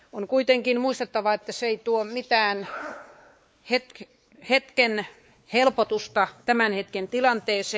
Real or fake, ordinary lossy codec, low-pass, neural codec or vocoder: fake; none; none; codec, 16 kHz, 4 kbps, X-Codec, WavLM features, trained on Multilingual LibriSpeech